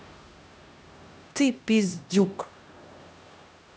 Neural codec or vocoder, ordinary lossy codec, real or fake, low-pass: codec, 16 kHz, 1 kbps, X-Codec, HuBERT features, trained on LibriSpeech; none; fake; none